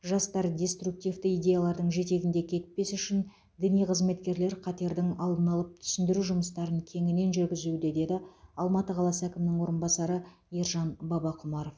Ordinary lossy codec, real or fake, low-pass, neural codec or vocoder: none; real; none; none